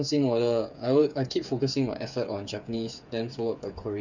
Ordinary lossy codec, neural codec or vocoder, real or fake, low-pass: none; codec, 16 kHz, 8 kbps, FreqCodec, smaller model; fake; 7.2 kHz